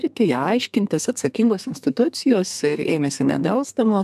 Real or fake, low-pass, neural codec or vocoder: fake; 14.4 kHz; codec, 32 kHz, 1.9 kbps, SNAC